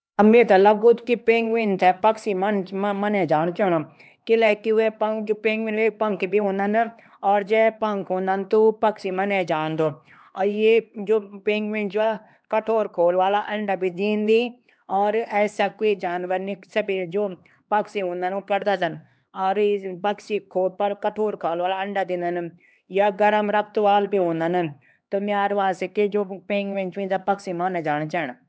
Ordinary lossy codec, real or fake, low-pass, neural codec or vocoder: none; fake; none; codec, 16 kHz, 2 kbps, X-Codec, HuBERT features, trained on LibriSpeech